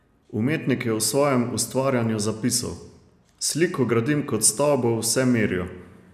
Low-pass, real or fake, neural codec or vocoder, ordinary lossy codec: 14.4 kHz; real; none; none